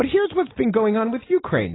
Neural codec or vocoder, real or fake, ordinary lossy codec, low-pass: none; real; AAC, 16 kbps; 7.2 kHz